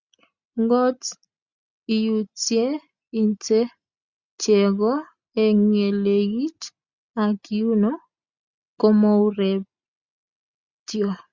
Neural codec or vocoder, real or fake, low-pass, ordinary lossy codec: none; real; 7.2 kHz; Opus, 64 kbps